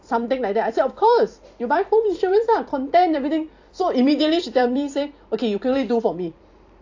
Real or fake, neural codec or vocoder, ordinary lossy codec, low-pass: fake; vocoder, 44.1 kHz, 128 mel bands every 256 samples, BigVGAN v2; AAC, 48 kbps; 7.2 kHz